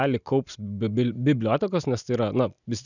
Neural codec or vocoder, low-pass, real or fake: none; 7.2 kHz; real